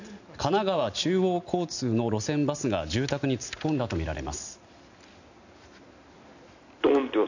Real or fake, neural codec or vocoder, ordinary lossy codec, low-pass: real; none; none; 7.2 kHz